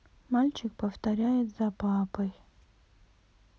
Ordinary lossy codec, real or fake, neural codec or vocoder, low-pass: none; real; none; none